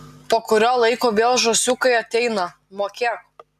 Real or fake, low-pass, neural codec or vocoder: real; 14.4 kHz; none